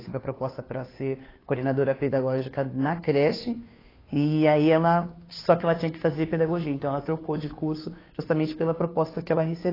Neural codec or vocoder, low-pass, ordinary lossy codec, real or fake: codec, 16 kHz, 2 kbps, FunCodec, trained on Chinese and English, 25 frames a second; 5.4 kHz; AAC, 24 kbps; fake